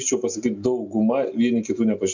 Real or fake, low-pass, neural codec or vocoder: real; 7.2 kHz; none